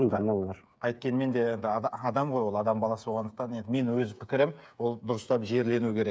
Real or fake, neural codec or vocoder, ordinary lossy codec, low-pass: fake; codec, 16 kHz, 8 kbps, FreqCodec, smaller model; none; none